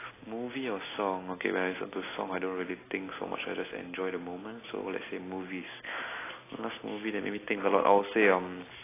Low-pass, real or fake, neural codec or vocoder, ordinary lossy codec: 3.6 kHz; real; none; AAC, 16 kbps